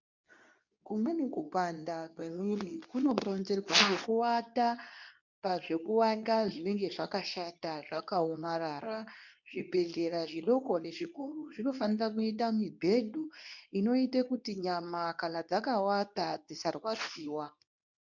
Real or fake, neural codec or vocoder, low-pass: fake; codec, 24 kHz, 0.9 kbps, WavTokenizer, medium speech release version 2; 7.2 kHz